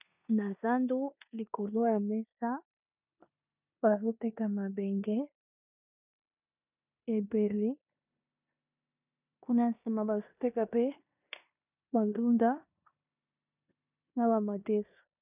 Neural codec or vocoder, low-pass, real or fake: codec, 16 kHz in and 24 kHz out, 0.9 kbps, LongCat-Audio-Codec, four codebook decoder; 3.6 kHz; fake